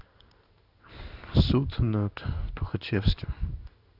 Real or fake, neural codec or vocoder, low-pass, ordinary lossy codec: fake; codec, 16 kHz in and 24 kHz out, 1 kbps, XY-Tokenizer; 5.4 kHz; Opus, 64 kbps